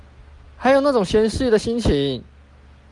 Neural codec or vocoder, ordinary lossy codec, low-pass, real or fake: none; Opus, 32 kbps; 10.8 kHz; real